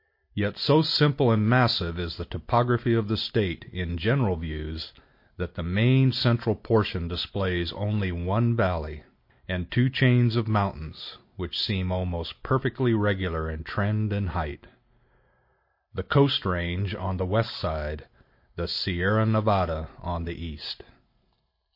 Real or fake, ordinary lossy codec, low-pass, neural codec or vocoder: real; MP3, 32 kbps; 5.4 kHz; none